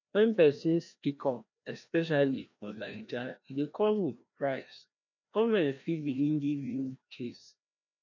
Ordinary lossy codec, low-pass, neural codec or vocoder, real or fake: none; 7.2 kHz; codec, 16 kHz, 1 kbps, FreqCodec, larger model; fake